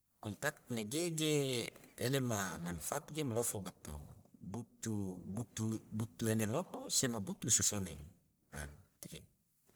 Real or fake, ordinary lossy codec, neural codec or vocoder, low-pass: fake; none; codec, 44.1 kHz, 1.7 kbps, Pupu-Codec; none